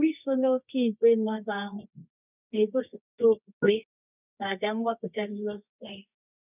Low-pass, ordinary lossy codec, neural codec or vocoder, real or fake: 3.6 kHz; none; codec, 24 kHz, 0.9 kbps, WavTokenizer, medium music audio release; fake